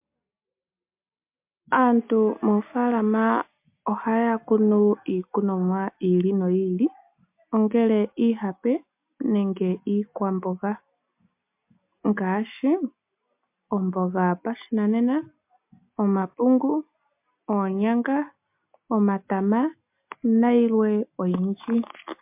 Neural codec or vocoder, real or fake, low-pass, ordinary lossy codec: none; real; 3.6 kHz; MP3, 32 kbps